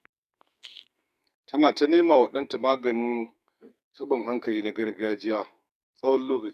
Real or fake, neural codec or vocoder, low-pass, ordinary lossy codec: fake; codec, 44.1 kHz, 2.6 kbps, SNAC; 14.4 kHz; none